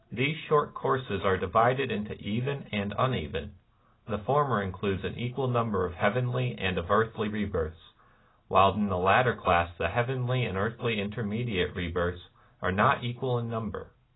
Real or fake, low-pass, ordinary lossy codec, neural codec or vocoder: real; 7.2 kHz; AAC, 16 kbps; none